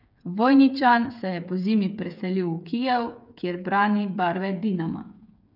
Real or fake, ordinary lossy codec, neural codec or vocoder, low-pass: fake; none; codec, 16 kHz, 8 kbps, FreqCodec, smaller model; 5.4 kHz